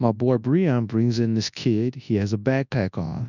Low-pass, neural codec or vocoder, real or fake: 7.2 kHz; codec, 24 kHz, 0.9 kbps, WavTokenizer, large speech release; fake